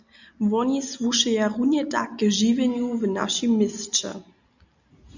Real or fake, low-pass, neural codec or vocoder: real; 7.2 kHz; none